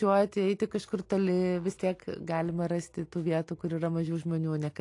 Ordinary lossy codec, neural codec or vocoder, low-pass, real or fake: AAC, 48 kbps; none; 10.8 kHz; real